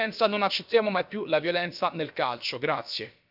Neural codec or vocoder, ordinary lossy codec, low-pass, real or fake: codec, 16 kHz, about 1 kbps, DyCAST, with the encoder's durations; none; 5.4 kHz; fake